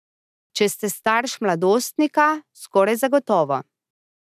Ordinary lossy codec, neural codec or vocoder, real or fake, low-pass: none; none; real; 14.4 kHz